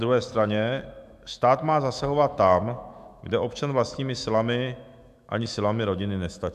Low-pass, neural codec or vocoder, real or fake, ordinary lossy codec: 14.4 kHz; autoencoder, 48 kHz, 128 numbers a frame, DAC-VAE, trained on Japanese speech; fake; MP3, 96 kbps